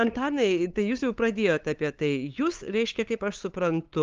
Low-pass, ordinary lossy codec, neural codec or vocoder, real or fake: 7.2 kHz; Opus, 32 kbps; codec, 16 kHz, 8 kbps, FunCodec, trained on LibriTTS, 25 frames a second; fake